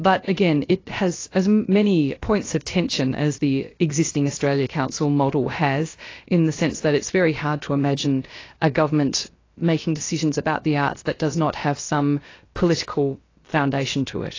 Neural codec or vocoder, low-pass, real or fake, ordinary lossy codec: codec, 16 kHz, about 1 kbps, DyCAST, with the encoder's durations; 7.2 kHz; fake; AAC, 32 kbps